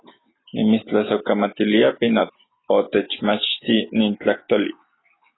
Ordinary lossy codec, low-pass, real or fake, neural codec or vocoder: AAC, 16 kbps; 7.2 kHz; real; none